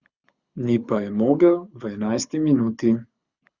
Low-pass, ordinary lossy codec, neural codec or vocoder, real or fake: 7.2 kHz; Opus, 64 kbps; codec, 24 kHz, 6 kbps, HILCodec; fake